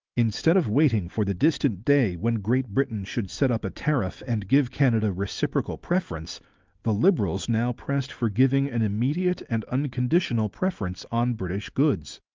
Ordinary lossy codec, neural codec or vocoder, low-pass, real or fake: Opus, 24 kbps; none; 7.2 kHz; real